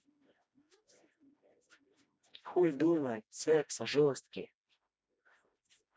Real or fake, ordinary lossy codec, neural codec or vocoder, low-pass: fake; none; codec, 16 kHz, 1 kbps, FreqCodec, smaller model; none